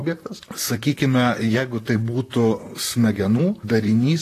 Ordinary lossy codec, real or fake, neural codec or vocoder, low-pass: AAC, 48 kbps; fake; vocoder, 44.1 kHz, 128 mel bands, Pupu-Vocoder; 14.4 kHz